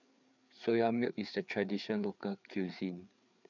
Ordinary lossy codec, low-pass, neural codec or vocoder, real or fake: none; 7.2 kHz; codec, 16 kHz, 4 kbps, FreqCodec, larger model; fake